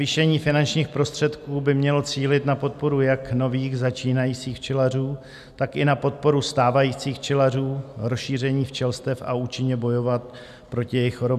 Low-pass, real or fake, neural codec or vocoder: 14.4 kHz; real; none